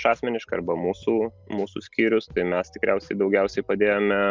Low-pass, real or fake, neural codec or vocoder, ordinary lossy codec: 7.2 kHz; real; none; Opus, 24 kbps